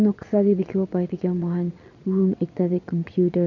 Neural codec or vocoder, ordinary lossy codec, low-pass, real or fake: vocoder, 22.05 kHz, 80 mel bands, Vocos; none; 7.2 kHz; fake